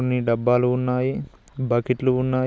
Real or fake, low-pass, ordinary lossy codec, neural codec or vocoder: real; none; none; none